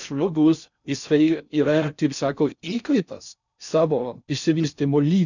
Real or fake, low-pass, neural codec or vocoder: fake; 7.2 kHz; codec, 16 kHz in and 24 kHz out, 0.6 kbps, FocalCodec, streaming, 4096 codes